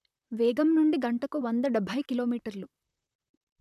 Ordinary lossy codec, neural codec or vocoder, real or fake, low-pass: none; vocoder, 44.1 kHz, 128 mel bands, Pupu-Vocoder; fake; 14.4 kHz